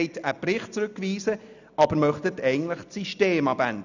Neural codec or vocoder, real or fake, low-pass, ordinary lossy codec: none; real; 7.2 kHz; none